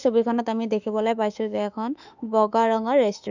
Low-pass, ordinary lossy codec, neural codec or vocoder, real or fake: 7.2 kHz; none; autoencoder, 48 kHz, 32 numbers a frame, DAC-VAE, trained on Japanese speech; fake